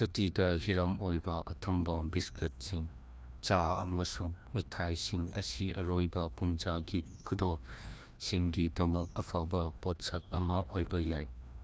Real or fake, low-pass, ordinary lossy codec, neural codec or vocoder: fake; none; none; codec, 16 kHz, 1 kbps, FreqCodec, larger model